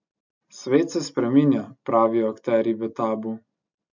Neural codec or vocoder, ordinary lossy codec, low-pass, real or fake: none; MP3, 48 kbps; 7.2 kHz; real